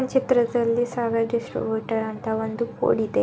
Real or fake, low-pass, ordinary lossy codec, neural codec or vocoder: real; none; none; none